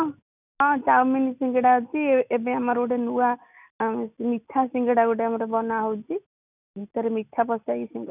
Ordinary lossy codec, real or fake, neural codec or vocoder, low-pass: none; real; none; 3.6 kHz